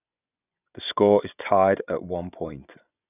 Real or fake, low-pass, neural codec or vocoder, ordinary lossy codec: fake; 3.6 kHz; vocoder, 24 kHz, 100 mel bands, Vocos; none